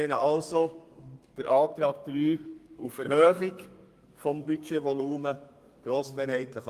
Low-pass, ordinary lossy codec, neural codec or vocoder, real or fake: 14.4 kHz; Opus, 32 kbps; codec, 32 kHz, 1.9 kbps, SNAC; fake